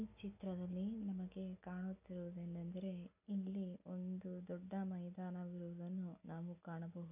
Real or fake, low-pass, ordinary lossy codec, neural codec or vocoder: real; 3.6 kHz; none; none